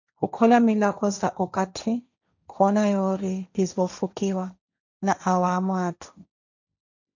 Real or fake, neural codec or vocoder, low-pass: fake; codec, 16 kHz, 1.1 kbps, Voila-Tokenizer; 7.2 kHz